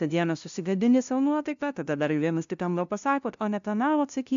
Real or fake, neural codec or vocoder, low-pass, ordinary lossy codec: fake; codec, 16 kHz, 0.5 kbps, FunCodec, trained on LibriTTS, 25 frames a second; 7.2 kHz; AAC, 96 kbps